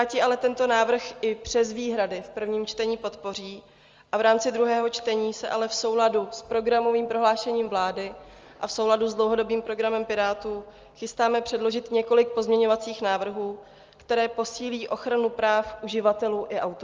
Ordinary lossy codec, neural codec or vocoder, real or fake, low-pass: Opus, 32 kbps; none; real; 7.2 kHz